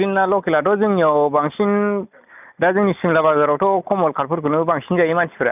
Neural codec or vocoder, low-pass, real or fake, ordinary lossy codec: none; 3.6 kHz; real; none